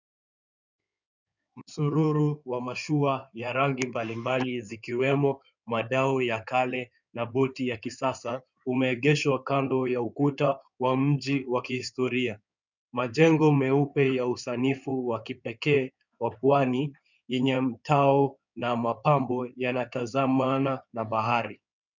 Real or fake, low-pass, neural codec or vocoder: fake; 7.2 kHz; codec, 16 kHz in and 24 kHz out, 2.2 kbps, FireRedTTS-2 codec